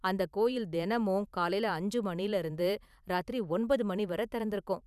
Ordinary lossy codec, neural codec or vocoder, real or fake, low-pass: none; vocoder, 44.1 kHz, 128 mel bands every 512 samples, BigVGAN v2; fake; 14.4 kHz